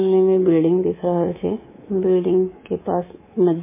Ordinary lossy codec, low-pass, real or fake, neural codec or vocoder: MP3, 16 kbps; 3.6 kHz; fake; codec, 24 kHz, 3.1 kbps, DualCodec